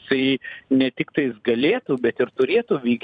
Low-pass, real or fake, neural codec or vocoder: 9.9 kHz; fake; vocoder, 44.1 kHz, 128 mel bands every 256 samples, BigVGAN v2